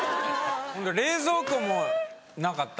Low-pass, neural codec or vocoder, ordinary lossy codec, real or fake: none; none; none; real